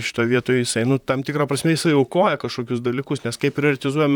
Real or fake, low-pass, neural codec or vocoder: fake; 19.8 kHz; vocoder, 44.1 kHz, 128 mel bands, Pupu-Vocoder